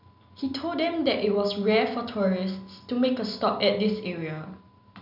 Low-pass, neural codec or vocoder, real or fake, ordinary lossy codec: 5.4 kHz; none; real; none